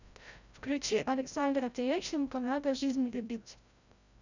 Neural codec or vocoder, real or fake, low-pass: codec, 16 kHz, 0.5 kbps, FreqCodec, larger model; fake; 7.2 kHz